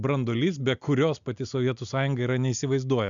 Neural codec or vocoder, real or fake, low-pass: none; real; 7.2 kHz